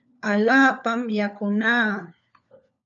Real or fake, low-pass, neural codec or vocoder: fake; 7.2 kHz; codec, 16 kHz, 4 kbps, FunCodec, trained on LibriTTS, 50 frames a second